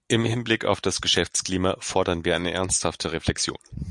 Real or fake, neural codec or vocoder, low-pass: real; none; 10.8 kHz